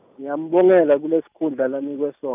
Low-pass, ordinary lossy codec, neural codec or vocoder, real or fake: 3.6 kHz; none; none; real